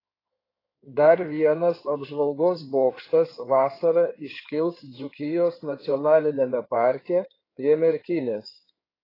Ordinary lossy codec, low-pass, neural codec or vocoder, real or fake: AAC, 24 kbps; 5.4 kHz; codec, 16 kHz in and 24 kHz out, 2.2 kbps, FireRedTTS-2 codec; fake